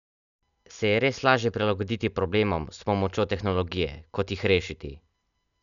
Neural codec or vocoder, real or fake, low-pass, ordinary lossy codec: none; real; 7.2 kHz; none